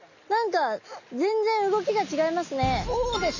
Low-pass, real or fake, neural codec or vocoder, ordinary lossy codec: 7.2 kHz; real; none; none